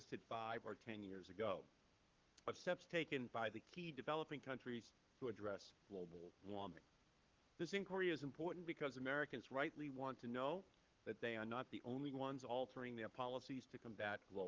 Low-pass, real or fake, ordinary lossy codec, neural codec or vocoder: 7.2 kHz; fake; Opus, 24 kbps; codec, 44.1 kHz, 7.8 kbps, Pupu-Codec